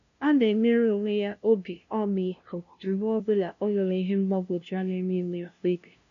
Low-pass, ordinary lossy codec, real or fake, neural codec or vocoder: 7.2 kHz; none; fake; codec, 16 kHz, 0.5 kbps, FunCodec, trained on LibriTTS, 25 frames a second